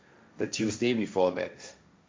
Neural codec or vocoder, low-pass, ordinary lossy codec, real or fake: codec, 16 kHz, 1.1 kbps, Voila-Tokenizer; none; none; fake